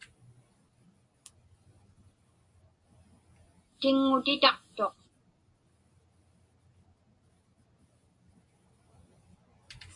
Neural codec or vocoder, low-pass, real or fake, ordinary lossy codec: none; 10.8 kHz; real; AAC, 48 kbps